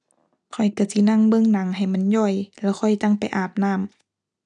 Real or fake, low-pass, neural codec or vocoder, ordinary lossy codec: real; 10.8 kHz; none; none